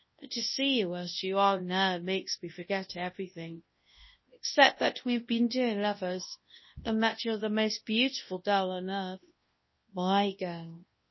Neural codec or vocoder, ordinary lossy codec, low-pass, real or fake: codec, 24 kHz, 0.9 kbps, WavTokenizer, large speech release; MP3, 24 kbps; 7.2 kHz; fake